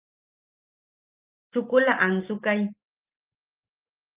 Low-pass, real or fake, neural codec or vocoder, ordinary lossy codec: 3.6 kHz; real; none; Opus, 32 kbps